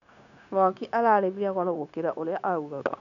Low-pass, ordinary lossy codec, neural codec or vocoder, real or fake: 7.2 kHz; none; codec, 16 kHz, 0.9 kbps, LongCat-Audio-Codec; fake